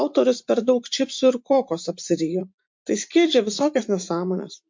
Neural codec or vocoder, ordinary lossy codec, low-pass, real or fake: vocoder, 44.1 kHz, 128 mel bands every 512 samples, BigVGAN v2; MP3, 48 kbps; 7.2 kHz; fake